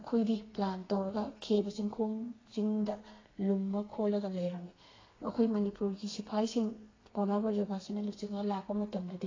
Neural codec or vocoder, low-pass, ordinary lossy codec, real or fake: codec, 32 kHz, 1.9 kbps, SNAC; 7.2 kHz; AAC, 32 kbps; fake